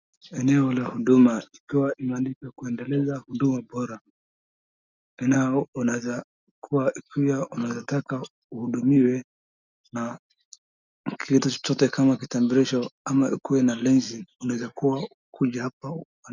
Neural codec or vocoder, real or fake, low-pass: none; real; 7.2 kHz